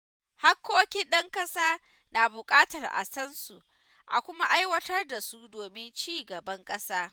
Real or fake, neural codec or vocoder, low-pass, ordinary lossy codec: fake; vocoder, 48 kHz, 128 mel bands, Vocos; none; none